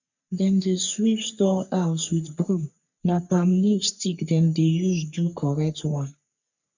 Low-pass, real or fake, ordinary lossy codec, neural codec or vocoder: 7.2 kHz; fake; none; codec, 44.1 kHz, 3.4 kbps, Pupu-Codec